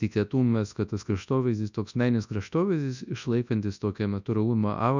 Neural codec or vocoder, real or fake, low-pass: codec, 24 kHz, 0.9 kbps, WavTokenizer, large speech release; fake; 7.2 kHz